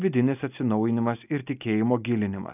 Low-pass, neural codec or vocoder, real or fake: 3.6 kHz; none; real